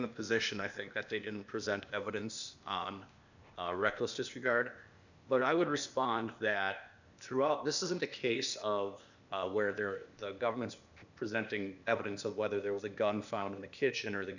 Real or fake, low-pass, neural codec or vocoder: fake; 7.2 kHz; codec, 16 kHz, 0.8 kbps, ZipCodec